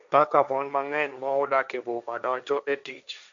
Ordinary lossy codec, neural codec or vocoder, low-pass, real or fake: none; codec, 16 kHz, 1.1 kbps, Voila-Tokenizer; 7.2 kHz; fake